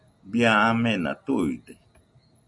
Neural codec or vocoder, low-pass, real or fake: none; 10.8 kHz; real